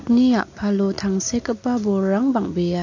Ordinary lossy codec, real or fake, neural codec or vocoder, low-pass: AAC, 48 kbps; real; none; 7.2 kHz